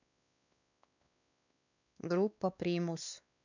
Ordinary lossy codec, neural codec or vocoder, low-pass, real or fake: none; codec, 16 kHz, 2 kbps, X-Codec, WavLM features, trained on Multilingual LibriSpeech; 7.2 kHz; fake